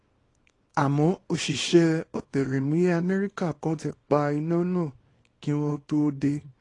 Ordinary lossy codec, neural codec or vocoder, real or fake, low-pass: AAC, 32 kbps; codec, 24 kHz, 0.9 kbps, WavTokenizer, small release; fake; 10.8 kHz